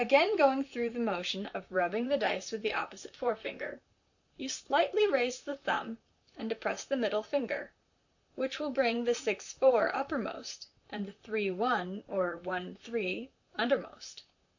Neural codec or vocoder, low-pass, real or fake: vocoder, 44.1 kHz, 128 mel bands, Pupu-Vocoder; 7.2 kHz; fake